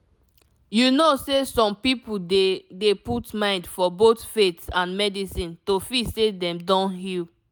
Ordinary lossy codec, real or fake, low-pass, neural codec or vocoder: none; real; none; none